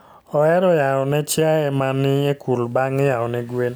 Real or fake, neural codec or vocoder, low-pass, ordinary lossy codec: real; none; none; none